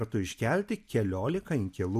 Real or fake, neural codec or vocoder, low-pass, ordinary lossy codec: real; none; 14.4 kHz; AAC, 96 kbps